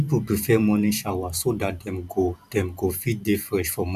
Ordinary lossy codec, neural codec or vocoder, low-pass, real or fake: none; vocoder, 44.1 kHz, 128 mel bands every 512 samples, BigVGAN v2; 14.4 kHz; fake